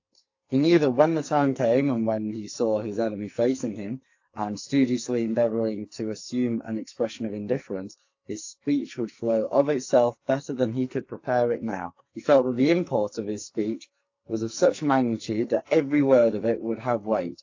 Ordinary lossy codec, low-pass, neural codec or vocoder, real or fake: AAC, 48 kbps; 7.2 kHz; codec, 44.1 kHz, 2.6 kbps, SNAC; fake